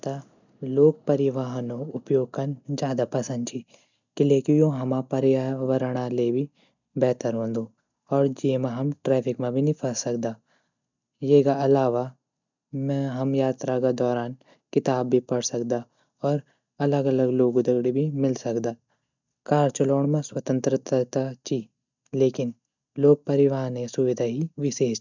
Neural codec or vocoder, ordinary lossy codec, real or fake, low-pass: none; none; real; 7.2 kHz